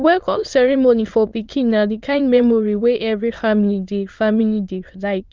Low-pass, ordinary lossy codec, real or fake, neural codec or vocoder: 7.2 kHz; Opus, 24 kbps; fake; autoencoder, 22.05 kHz, a latent of 192 numbers a frame, VITS, trained on many speakers